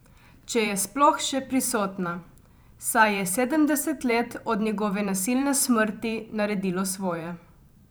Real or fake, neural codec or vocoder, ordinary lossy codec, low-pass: fake; vocoder, 44.1 kHz, 128 mel bands every 512 samples, BigVGAN v2; none; none